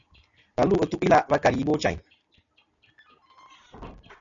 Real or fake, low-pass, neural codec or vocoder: real; 7.2 kHz; none